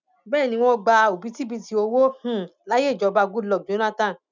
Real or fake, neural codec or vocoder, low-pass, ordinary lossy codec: real; none; 7.2 kHz; none